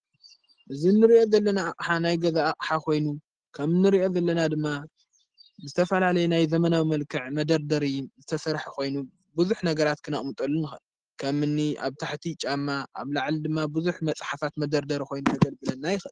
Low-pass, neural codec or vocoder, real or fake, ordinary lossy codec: 9.9 kHz; none; real; Opus, 16 kbps